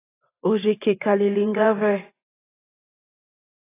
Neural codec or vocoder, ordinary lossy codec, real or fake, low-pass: vocoder, 44.1 kHz, 128 mel bands every 512 samples, BigVGAN v2; AAC, 16 kbps; fake; 3.6 kHz